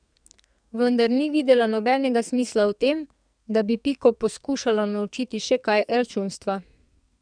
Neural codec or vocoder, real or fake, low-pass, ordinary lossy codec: codec, 44.1 kHz, 2.6 kbps, SNAC; fake; 9.9 kHz; none